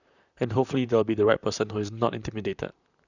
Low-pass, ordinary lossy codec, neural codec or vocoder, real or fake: 7.2 kHz; none; vocoder, 44.1 kHz, 128 mel bands, Pupu-Vocoder; fake